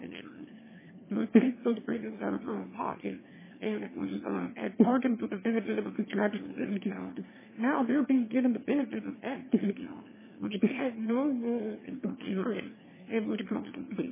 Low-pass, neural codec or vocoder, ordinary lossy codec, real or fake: 3.6 kHz; autoencoder, 22.05 kHz, a latent of 192 numbers a frame, VITS, trained on one speaker; MP3, 16 kbps; fake